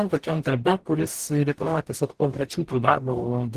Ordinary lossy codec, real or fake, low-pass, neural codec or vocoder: Opus, 16 kbps; fake; 14.4 kHz; codec, 44.1 kHz, 0.9 kbps, DAC